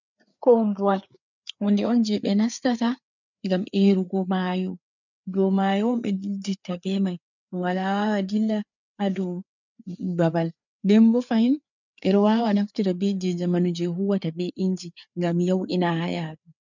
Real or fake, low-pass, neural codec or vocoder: fake; 7.2 kHz; codec, 16 kHz, 4 kbps, FreqCodec, larger model